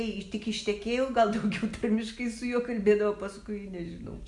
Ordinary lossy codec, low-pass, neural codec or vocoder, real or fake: MP3, 64 kbps; 10.8 kHz; none; real